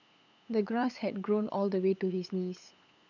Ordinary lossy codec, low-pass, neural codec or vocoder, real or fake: none; 7.2 kHz; codec, 16 kHz, 8 kbps, FunCodec, trained on LibriTTS, 25 frames a second; fake